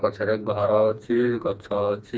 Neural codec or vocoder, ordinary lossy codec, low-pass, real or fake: codec, 16 kHz, 2 kbps, FreqCodec, smaller model; none; none; fake